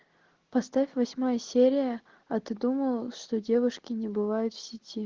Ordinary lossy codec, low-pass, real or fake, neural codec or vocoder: Opus, 16 kbps; 7.2 kHz; real; none